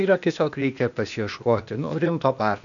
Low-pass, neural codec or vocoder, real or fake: 7.2 kHz; codec, 16 kHz, 0.8 kbps, ZipCodec; fake